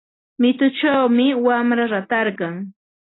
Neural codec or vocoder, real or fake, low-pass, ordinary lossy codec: none; real; 7.2 kHz; AAC, 16 kbps